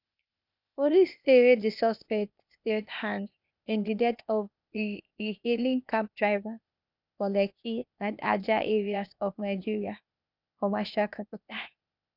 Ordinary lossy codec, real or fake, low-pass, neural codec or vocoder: none; fake; 5.4 kHz; codec, 16 kHz, 0.8 kbps, ZipCodec